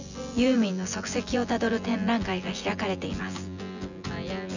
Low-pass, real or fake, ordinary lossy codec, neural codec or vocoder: 7.2 kHz; fake; none; vocoder, 24 kHz, 100 mel bands, Vocos